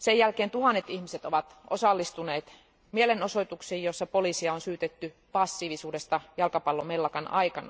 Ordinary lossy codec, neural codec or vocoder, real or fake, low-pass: none; none; real; none